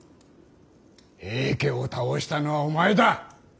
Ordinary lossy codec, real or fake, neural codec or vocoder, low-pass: none; real; none; none